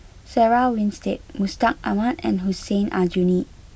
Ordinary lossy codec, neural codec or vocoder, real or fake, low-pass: none; none; real; none